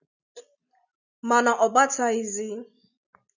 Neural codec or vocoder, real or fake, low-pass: none; real; 7.2 kHz